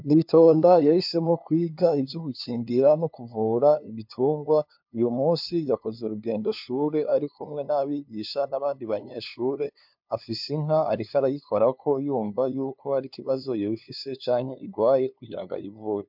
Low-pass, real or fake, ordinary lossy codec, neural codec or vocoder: 5.4 kHz; fake; MP3, 48 kbps; codec, 16 kHz, 2 kbps, FunCodec, trained on LibriTTS, 25 frames a second